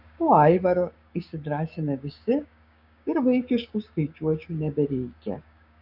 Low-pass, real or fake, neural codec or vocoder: 5.4 kHz; fake; codec, 16 kHz, 6 kbps, DAC